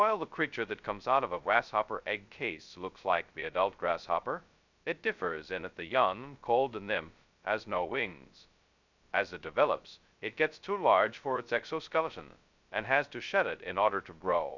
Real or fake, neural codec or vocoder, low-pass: fake; codec, 16 kHz, 0.2 kbps, FocalCodec; 7.2 kHz